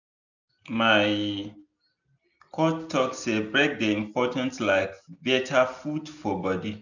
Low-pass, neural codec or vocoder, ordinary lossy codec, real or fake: 7.2 kHz; none; none; real